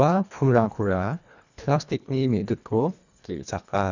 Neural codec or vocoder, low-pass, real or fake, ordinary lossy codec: codec, 24 kHz, 1.5 kbps, HILCodec; 7.2 kHz; fake; none